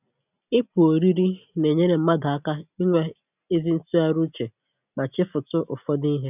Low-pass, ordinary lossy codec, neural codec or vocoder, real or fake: 3.6 kHz; none; none; real